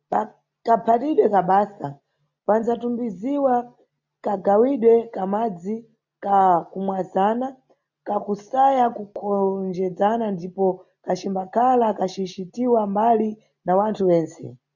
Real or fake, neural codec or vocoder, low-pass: real; none; 7.2 kHz